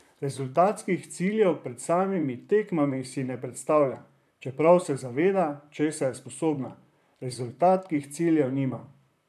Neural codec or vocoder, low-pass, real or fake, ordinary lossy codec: vocoder, 44.1 kHz, 128 mel bands, Pupu-Vocoder; 14.4 kHz; fake; none